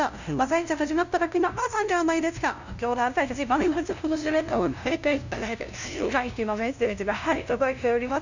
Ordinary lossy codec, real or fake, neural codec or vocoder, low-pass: none; fake; codec, 16 kHz, 0.5 kbps, FunCodec, trained on LibriTTS, 25 frames a second; 7.2 kHz